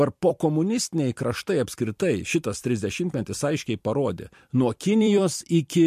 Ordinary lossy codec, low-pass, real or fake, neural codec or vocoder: MP3, 64 kbps; 14.4 kHz; fake; vocoder, 44.1 kHz, 128 mel bands every 256 samples, BigVGAN v2